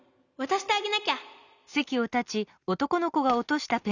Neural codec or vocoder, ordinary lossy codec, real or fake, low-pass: none; none; real; 7.2 kHz